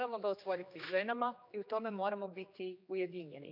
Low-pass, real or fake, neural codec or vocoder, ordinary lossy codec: 5.4 kHz; fake; codec, 16 kHz, 2 kbps, X-Codec, HuBERT features, trained on general audio; none